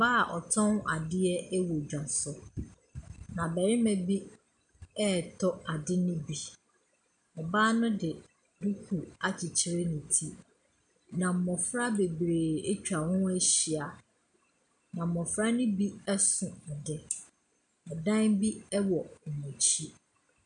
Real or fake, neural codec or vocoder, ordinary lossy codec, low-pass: real; none; MP3, 96 kbps; 9.9 kHz